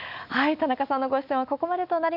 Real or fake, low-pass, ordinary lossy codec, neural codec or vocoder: real; 5.4 kHz; none; none